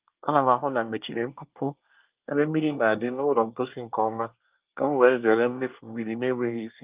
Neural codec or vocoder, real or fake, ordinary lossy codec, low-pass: codec, 24 kHz, 1 kbps, SNAC; fake; Opus, 24 kbps; 3.6 kHz